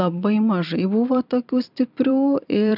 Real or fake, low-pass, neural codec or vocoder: fake; 5.4 kHz; vocoder, 44.1 kHz, 128 mel bands every 256 samples, BigVGAN v2